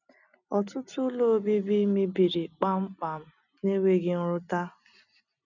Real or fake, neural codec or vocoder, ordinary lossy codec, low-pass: real; none; none; 7.2 kHz